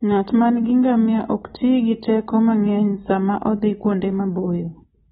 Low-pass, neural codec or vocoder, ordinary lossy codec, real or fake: 7.2 kHz; none; AAC, 16 kbps; real